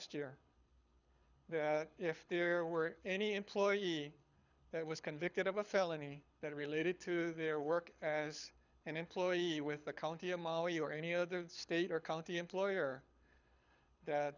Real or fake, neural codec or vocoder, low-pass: fake; codec, 24 kHz, 6 kbps, HILCodec; 7.2 kHz